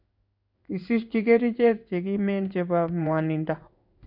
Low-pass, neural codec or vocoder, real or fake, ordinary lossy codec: 5.4 kHz; codec, 16 kHz in and 24 kHz out, 1 kbps, XY-Tokenizer; fake; none